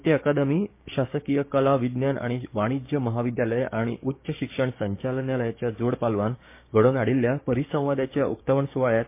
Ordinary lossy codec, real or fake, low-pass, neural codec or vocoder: MP3, 24 kbps; fake; 3.6 kHz; codec, 16 kHz, 6 kbps, DAC